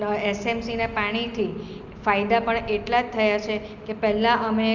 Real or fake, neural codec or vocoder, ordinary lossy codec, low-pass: real; none; Opus, 32 kbps; 7.2 kHz